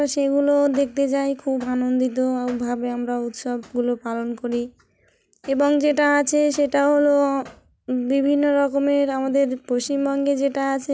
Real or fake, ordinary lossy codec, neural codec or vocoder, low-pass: real; none; none; none